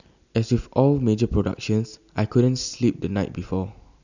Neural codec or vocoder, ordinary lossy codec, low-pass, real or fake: none; none; 7.2 kHz; real